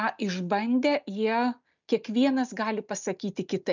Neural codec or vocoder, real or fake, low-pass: none; real; 7.2 kHz